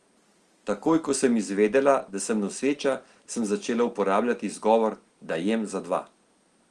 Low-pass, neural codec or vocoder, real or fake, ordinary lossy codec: 10.8 kHz; none; real; Opus, 24 kbps